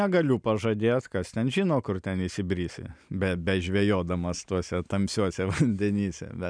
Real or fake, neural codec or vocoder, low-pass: real; none; 9.9 kHz